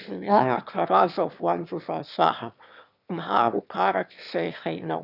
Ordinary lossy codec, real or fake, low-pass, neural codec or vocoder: none; fake; 5.4 kHz; autoencoder, 22.05 kHz, a latent of 192 numbers a frame, VITS, trained on one speaker